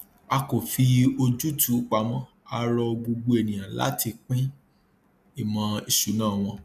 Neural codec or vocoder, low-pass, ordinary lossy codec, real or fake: none; 14.4 kHz; none; real